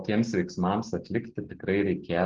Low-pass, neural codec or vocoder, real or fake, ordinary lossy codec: 7.2 kHz; none; real; Opus, 16 kbps